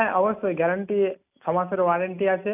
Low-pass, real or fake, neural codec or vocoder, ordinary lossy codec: 3.6 kHz; real; none; none